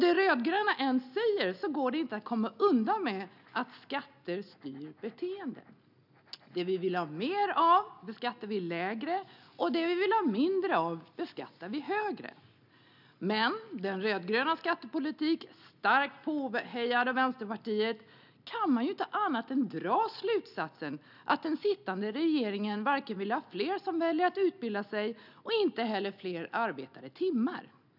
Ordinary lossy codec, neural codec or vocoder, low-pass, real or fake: none; none; 5.4 kHz; real